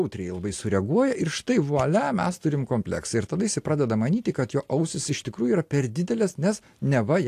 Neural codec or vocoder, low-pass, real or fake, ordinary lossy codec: none; 14.4 kHz; real; AAC, 64 kbps